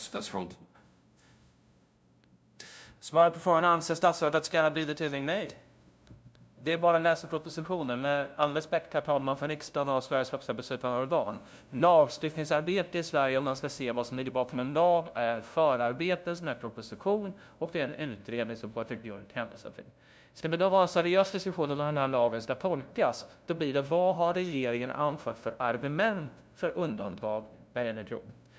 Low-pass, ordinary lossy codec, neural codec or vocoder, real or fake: none; none; codec, 16 kHz, 0.5 kbps, FunCodec, trained on LibriTTS, 25 frames a second; fake